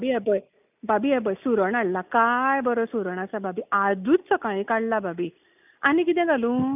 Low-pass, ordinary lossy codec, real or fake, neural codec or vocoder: 3.6 kHz; none; real; none